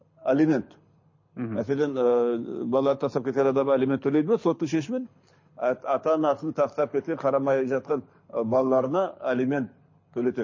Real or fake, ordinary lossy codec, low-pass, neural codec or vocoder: fake; MP3, 32 kbps; 7.2 kHz; codec, 24 kHz, 6 kbps, HILCodec